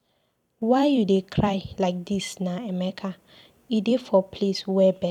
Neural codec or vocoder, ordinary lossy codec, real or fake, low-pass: vocoder, 44.1 kHz, 128 mel bands every 256 samples, BigVGAN v2; none; fake; 19.8 kHz